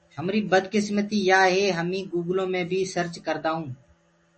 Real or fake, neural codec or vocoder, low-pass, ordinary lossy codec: real; none; 10.8 kHz; MP3, 32 kbps